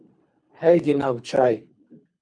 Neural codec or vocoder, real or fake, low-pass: codec, 24 kHz, 3 kbps, HILCodec; fake; 9.9 kHz